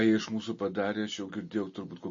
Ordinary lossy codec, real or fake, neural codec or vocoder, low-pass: MP3, 32 kbps; real; none; 7.2 kHz